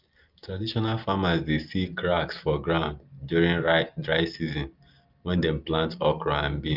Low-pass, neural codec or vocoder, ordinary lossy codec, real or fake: 5.4 kHz; none; Opus, 24 kbps; real